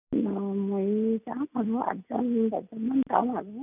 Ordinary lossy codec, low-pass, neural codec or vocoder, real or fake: none; 3.6 kHz; none; real